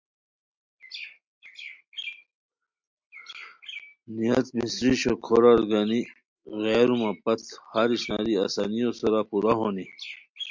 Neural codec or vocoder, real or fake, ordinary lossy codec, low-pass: none; real; MP3, 64 kbps; 7.2 kHz